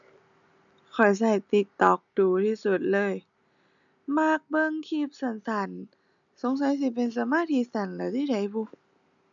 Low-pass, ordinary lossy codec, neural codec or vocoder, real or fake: 7.2 kHz; none; none; real